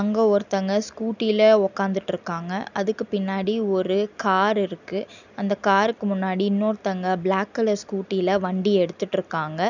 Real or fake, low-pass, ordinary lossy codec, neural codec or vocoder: real; 7.2 kHz; none; none